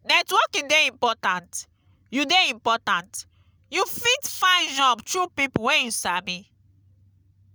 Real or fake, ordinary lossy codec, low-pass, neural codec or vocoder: real; none; none; none